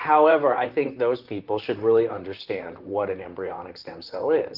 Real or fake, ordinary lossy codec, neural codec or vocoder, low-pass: fake; Opus, 24 kbps; vocoder, 44.1 kHz, 128 mel bands, Pupu-Vocoder; 5.4 kHz